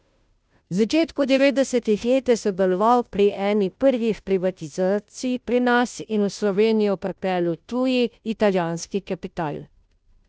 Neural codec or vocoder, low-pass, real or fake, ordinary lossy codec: codec, 16 kHz, 0.5 kbps, FunCodec, trained on Chinese and English, 25 frames a second; none; fake; none